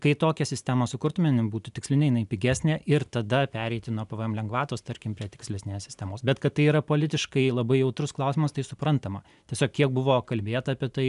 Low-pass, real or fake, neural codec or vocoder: 10.8 kHz; real; none